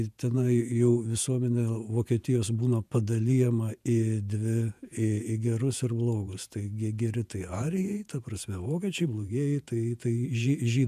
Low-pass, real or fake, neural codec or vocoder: 14.4 kHz; fake; vocoder, 48 kHz, 128 mel bands, Vocos